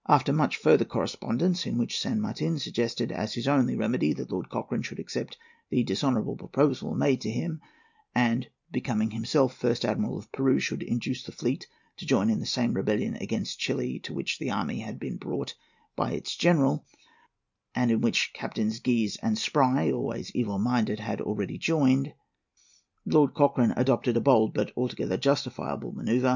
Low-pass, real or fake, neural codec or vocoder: 7.2 kHz; real; none